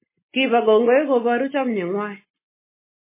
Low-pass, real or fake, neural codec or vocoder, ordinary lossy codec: 3.6 kHz; real; none; MP3, 16 kbps